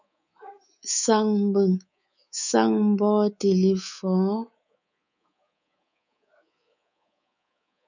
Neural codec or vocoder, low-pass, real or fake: codec, 24 kHz, 3.1 kbps, DualCodec; 7.2 kHz; fake